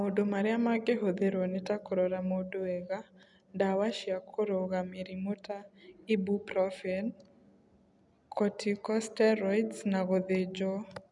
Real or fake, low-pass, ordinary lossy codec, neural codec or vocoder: real; 10.8 kHz; none; none